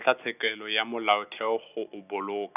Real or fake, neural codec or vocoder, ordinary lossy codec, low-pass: real; none; none; 3.6 kHz